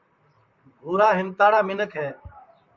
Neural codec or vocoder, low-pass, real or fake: vocoder, 44.1 kHz, 128 mel bands, Pupu-Vocoder; 7.2 kHz; fake